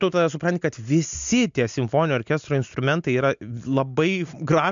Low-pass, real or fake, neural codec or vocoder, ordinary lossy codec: 7.2 kHz; real; none; MP3, 64 kbps